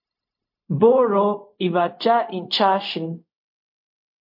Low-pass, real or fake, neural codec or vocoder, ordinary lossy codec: 5.4 kHz; fake; codec, 16 kHz, 0.4 kbps, LongCat-Audio-Codec; MP3, 32 kbps